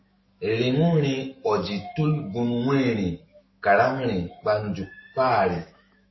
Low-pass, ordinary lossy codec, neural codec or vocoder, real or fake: 7.2 kHz; MP3, 24 kbps; autoencoder, 48 kHz, 128 numbers a frame, DAC-VAE, trained on Japanese speech; fake